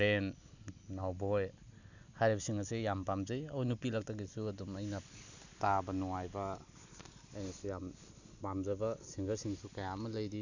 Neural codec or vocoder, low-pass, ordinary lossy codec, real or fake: none; 7.2 kHz; none; real